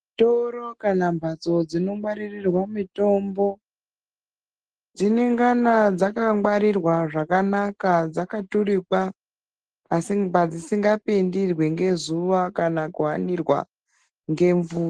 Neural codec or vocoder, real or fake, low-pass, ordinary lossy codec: none; real; 10.8 kHz; Opus, 16 kbps